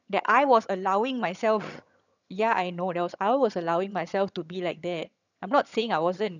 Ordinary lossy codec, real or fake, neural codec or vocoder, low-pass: none; fake; vocoder, 22.05 kHz, 80 mel bands, HiFi-GAN; 7.2 kHz